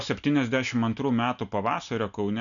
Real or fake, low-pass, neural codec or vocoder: real; 7.2 kHz; none